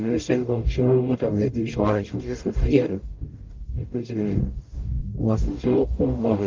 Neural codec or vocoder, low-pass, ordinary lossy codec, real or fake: codec, 44.1 kHz, 0.9 kbps, DAC; 7.2 kHz; Opus, 24 kbps; fake